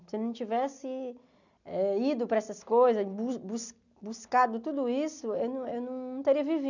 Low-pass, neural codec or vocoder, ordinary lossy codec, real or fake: 7.2 kHz; none; none; real